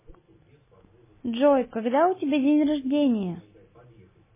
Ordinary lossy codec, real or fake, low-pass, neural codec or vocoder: MP3, 16 kbps; real; 3.6 kHz; none